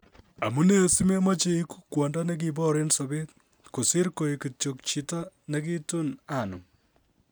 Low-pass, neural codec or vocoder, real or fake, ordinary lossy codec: none; none; real; none